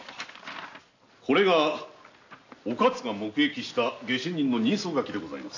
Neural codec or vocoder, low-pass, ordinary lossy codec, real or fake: none; 7.2 kHz; AAC, 48 kbps; real